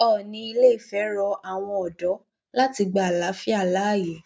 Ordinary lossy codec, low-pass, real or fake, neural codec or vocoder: none; none; real; none